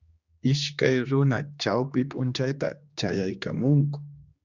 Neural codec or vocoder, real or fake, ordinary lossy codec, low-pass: codec, 16 kHz, 2 kbps, X-Codec, HuBERT features, trained on general audio; fake; Opus, 64 kbps; 7.2 kHz